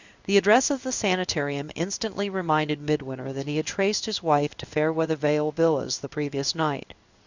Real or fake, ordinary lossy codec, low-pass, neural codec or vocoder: fake; Opus, 64 kbps; 7.2 kHz; codec, 16 kHz in and 24 kHz out, 1 kbps, XY-Tokenizer